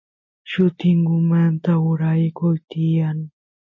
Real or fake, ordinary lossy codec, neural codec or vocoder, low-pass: real; MP3, 32 kbps; none; 7.2 kHz